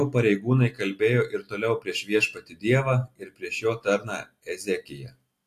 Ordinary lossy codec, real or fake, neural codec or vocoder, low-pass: AAC, 64 kbps; real; none; 14.4 kHz